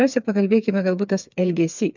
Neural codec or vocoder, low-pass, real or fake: codec, 16 kHz, 4 kbps, FreqCodec, smaller model; 7.2 kHz; fake